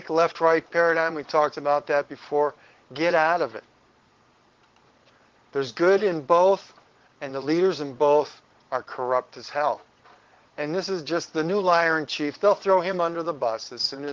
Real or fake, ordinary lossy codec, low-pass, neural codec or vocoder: fake; Opus, 16 kbps; 7.2 kHz; vocoder, 22.05 kHz, 80 mel bands, Vocos